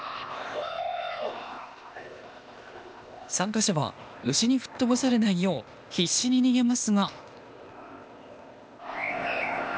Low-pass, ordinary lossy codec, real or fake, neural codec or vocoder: none; none; fake; codec, 16 kHz, 0.8 kbps, ZipCodec